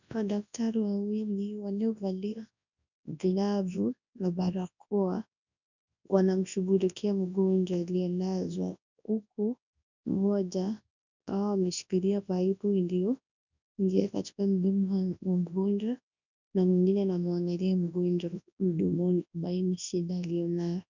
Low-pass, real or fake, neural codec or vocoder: 7.2 kHz; fake; codec, 24 kHz, 0.9 kbps, WavTokenizer, large speech release